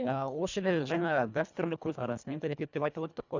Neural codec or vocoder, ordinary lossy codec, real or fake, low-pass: codec, 24 kHz, 1.5 kbps, HILCodec; none; fake; 7.2 kHz